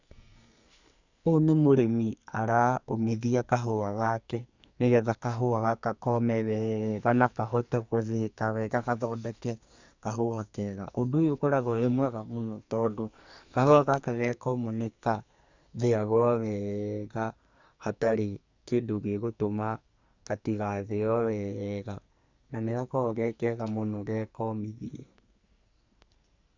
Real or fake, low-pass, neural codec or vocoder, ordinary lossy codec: fake; 7.2 kHz; codec, 44.1 kHz, 2.6 kbps, SNAC; Opus, 64 kbps